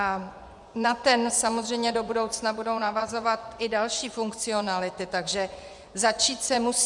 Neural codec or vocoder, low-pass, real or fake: vocoder, 24 kHz, 100 mel bands, Vocos; 10.8 kHz; fake